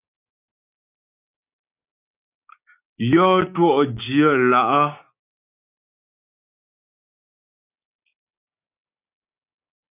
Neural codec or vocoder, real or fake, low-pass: codec, 16 kHz, 6 kbps, DAC; fake; 3.6 kHz